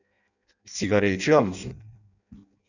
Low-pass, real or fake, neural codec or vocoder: 7.2 kHz; fake; codec, 16 kHz in and 24 kHz out, 0.6 kbps, FireRedTTS-2 codec